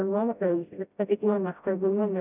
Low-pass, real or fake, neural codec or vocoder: 3.6 kHz; fake; codec, 16 kHz, 0.5 kbps, FreqCodec, smaller model